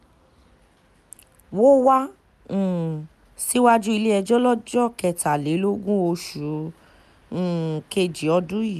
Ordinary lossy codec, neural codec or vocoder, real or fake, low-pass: none; none; real; 14.4 kHz